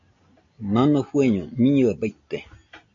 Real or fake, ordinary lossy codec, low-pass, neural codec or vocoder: real; AAC, 48 kbps; 7.2 kHz; none